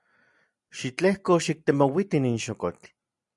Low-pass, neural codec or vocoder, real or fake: 10.8 kHz; none; real